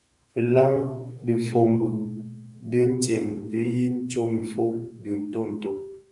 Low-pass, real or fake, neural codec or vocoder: 10.8 kHz; fake; autoencoder, 48 kHz, 32 numbers a frame, DAC-VAE, trained on Japanese speech